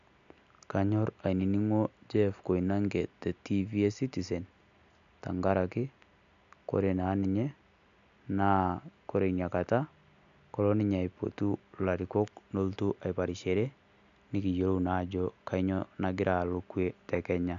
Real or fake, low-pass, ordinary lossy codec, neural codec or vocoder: real; 7.2 kHz; MP3, 96 kbps; none